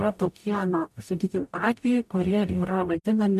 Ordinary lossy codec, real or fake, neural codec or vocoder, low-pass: MP3, 64 kbps; fake; codec, 44.1 kHz, 0.9 kbps, DAC; 14.4 kHz